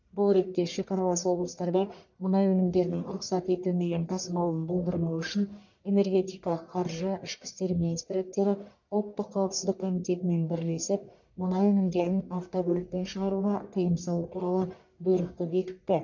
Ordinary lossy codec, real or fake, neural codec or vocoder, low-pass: none; fake; codec, 44.1 kHz, 1.7 kbps, Pupu-Codec; 7.2 kHz